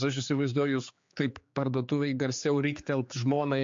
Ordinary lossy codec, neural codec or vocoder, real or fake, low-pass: MP3, 48 kbps; codec, 16 kHz, 4 kbps, X-Codec, HuBERT features, trained on general audio; fake; 7.2 kHz